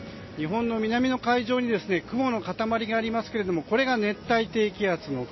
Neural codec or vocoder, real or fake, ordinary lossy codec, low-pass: none; real; MP3, 24 kbps; 7.2 kHz